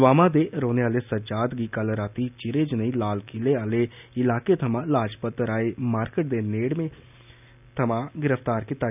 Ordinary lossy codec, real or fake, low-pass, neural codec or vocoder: none; real; 3.6 kHz; none